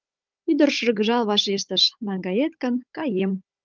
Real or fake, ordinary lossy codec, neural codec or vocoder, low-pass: fake; Opus, 32 kbps; codec, 16 kHz, 16 kbps, FunCodec, trained on Chinese and English, 50 frames a second; 7.2 kHz